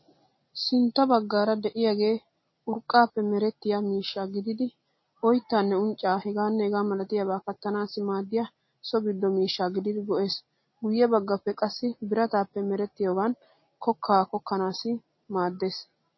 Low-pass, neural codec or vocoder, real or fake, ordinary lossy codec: 7.2 kHz; none; real; MP3, 24 kbps